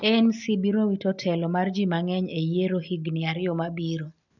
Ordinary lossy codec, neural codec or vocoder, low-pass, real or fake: none; none; 7.2 kHz; real